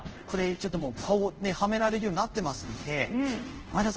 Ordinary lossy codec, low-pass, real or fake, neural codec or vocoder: Opus, 16 kbps; 7.2 kHz; fake; codec, 24 kHz, 0.5 kbps, DualCodec